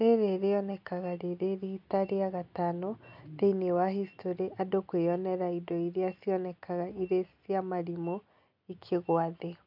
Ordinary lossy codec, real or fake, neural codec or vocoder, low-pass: MP3, 48 kbps; real; none; 5.4 kHz